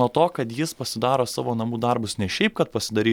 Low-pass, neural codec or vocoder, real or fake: 19.8 kHz; none; real